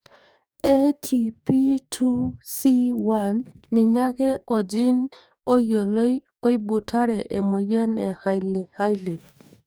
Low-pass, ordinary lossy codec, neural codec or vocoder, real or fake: none; none; codec, 44.1 kHz, 2.6 kbps, DAC; fake